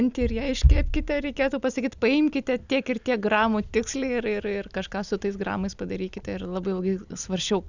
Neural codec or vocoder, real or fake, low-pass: none; real; 7.2 kHz